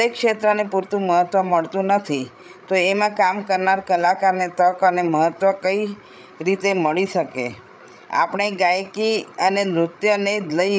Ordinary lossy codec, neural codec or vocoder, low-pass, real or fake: none; codec, 16 kHz, 8 kbps, FreqCodec, larger model; none; fake